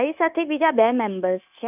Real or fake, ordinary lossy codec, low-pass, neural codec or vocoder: fake; none; 3.6 kHz; codec, 16 kHz, 0.9 kbps, LongCat-Audio-Codec